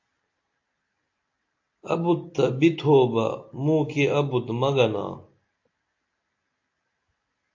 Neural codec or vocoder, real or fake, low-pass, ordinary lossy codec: none; real; 7.2 kHz; AAC, 48 kbps